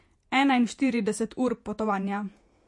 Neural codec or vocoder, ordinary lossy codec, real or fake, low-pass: vocoder, 48 kHz, 128 mel bands, Vocos; MP3, 48 kbps; fake; 10.8 kHz